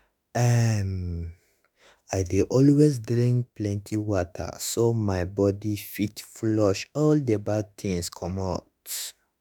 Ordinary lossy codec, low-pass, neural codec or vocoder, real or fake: none; none; autoencoder, 48 kHz, 32 numbers a frame, DAC-VAE, trained on Japanese speech; fake